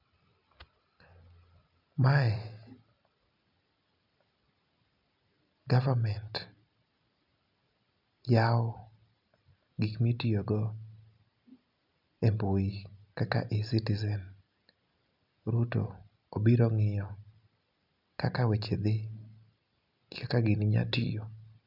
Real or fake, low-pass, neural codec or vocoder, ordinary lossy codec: real; 5.4 kHz; none; none